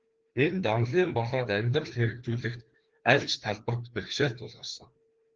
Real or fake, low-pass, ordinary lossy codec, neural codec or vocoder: fake; 7.2 kHz; Opus, 16 kbps; codec, 16 kHz, 2 kbps, FreqCodec, larger model